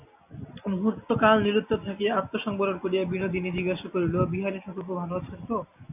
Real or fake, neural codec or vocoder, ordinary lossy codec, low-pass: real; none; AAC, 32 kbps; 3.6 kHz